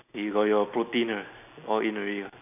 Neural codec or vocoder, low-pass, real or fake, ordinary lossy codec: none; 3.6 kHz; real; none